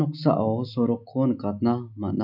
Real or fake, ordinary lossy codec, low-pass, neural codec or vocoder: real; none; 5.4 kHz; none